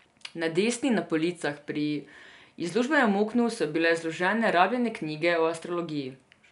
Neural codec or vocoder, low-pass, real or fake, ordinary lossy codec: none; 10.8 kHz; real; none